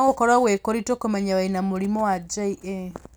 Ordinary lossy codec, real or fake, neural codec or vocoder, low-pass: none; real; none; none